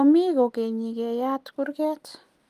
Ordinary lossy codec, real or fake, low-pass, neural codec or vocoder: Opus, 32 kbps; real; 14.4 kHz; none